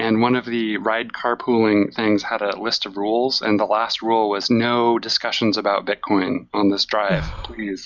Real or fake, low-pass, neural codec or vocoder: real; 7.2 kHz; none